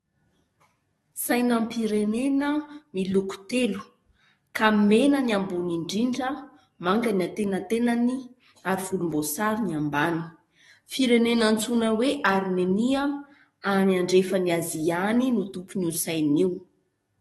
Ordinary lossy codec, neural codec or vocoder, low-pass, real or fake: AAC, 32 kbps; codec, 44.1 kHz, 7.8 kbps, DAC; 19.8 kHz; fake